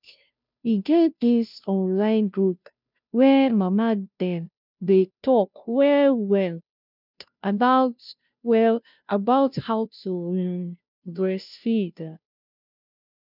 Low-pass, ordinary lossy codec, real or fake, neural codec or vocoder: 5.4 kHz; none; fake; codec, 16 kHz, 0.5 kbps, FunCodec, trained on Chinese and English, 25 frames a second